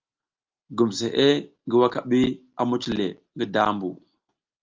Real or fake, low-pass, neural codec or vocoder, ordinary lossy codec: real; 7.2 kHz; none; Opus, 24 kbps